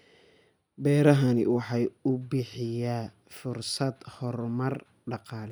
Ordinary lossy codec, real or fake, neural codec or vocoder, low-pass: none; real; none; none